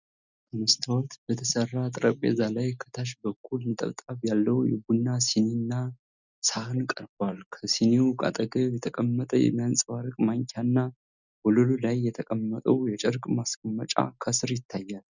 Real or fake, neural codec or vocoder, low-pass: real; none; 7.2 kHz